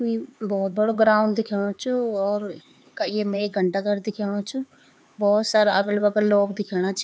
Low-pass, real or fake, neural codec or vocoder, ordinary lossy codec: none; fake; codec, 16 kHz, 4 kbps, X-Codec, HuBERT features, trained on LibriSpeech; none